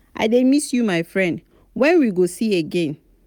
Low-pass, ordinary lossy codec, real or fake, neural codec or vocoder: none; none; real; none